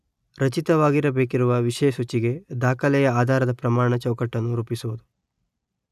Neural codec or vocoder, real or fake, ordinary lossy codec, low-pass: none; real; none; 14.4 kHz